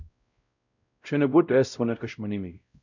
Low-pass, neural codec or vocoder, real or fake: 7.2 kHz; codec, 16 kHz, 0.5 kbps, X-Codec, WavLM features, trained on Multilingual LibriSpeech; fake